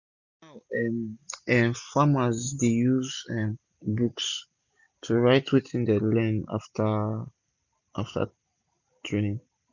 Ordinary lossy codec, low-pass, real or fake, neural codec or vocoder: none; 7.2 kHz; real; none